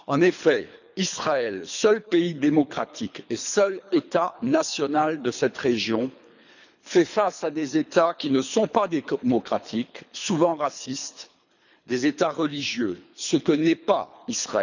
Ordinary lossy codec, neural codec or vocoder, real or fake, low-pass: none; codec, 24 kHz, 3 kbps, HILCodec; fake; 7.2 kHz